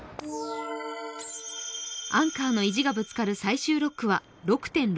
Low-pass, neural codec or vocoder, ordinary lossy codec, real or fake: none; none; none; real